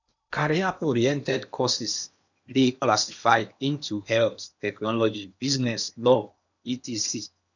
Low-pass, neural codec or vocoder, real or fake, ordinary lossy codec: 7.2 kHz; codec, 16 kHz in and 24 kHz out, 0.8 kbps, FocalCodec, streaming, 65536 codes; fake; none